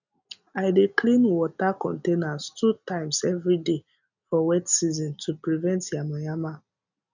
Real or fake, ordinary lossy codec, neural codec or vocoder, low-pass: real; none; none; 7.2 kHz